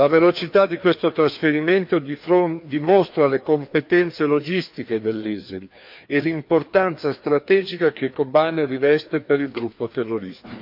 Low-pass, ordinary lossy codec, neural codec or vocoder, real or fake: 5.4 kHz; MP3, 48 kbps; codec, 16 kHz, 2 kbps, FreqCodec, larger model; fake